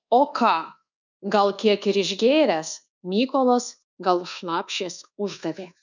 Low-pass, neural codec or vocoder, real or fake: 7.2 kHz; codec, 24 kHz, 1.2 kbps, DualCodec; fake